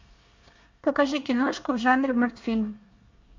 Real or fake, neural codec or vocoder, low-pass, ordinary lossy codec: fake; codec, 24 kHz, 1 kbps, SNAC; 7.2 kHz; MP3, 64 kbps